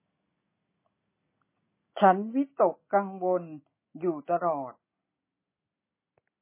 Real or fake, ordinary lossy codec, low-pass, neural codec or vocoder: real; MP3, 16 kbps; 3.6 kHz; none